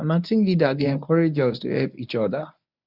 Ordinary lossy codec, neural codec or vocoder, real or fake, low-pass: none; codec, 24 kHz, 0.9 kbps, WavTokenizer, medium speech release version 1; fake; 5.4 kHz